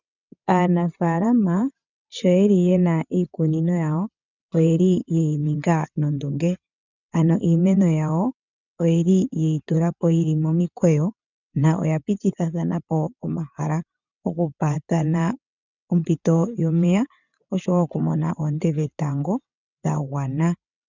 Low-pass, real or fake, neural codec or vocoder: 7.2 kHz; fake; vocoder, 22.05 kHz, 80 mel bands, WaveNeXt